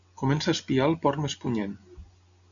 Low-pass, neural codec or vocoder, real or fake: 7.2 kHz; none; real